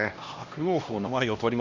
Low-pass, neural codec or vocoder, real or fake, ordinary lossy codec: 7.2 kHz; codec, 16 kHz, 1 kbps, X-Codec, HuBERT features, trained on LibriSpeech; fake; Opus, 64 kbps